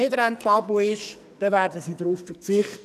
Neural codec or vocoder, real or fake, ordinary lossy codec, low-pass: codec, 44.1 kHz, 2.6 kbps, SNAC; fake; none; 14.4 kHz